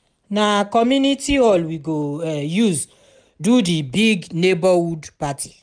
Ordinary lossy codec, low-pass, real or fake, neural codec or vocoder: none; 9.9 kHz; real; none